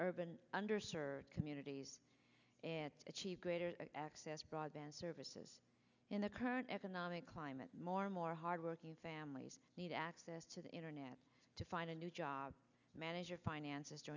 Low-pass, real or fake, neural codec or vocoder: 7.2 kHz; real; none